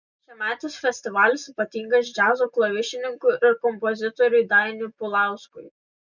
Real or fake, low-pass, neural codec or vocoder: real; 7.2 kHz; none